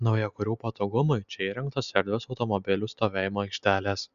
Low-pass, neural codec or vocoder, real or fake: 7.2 kHz; none; real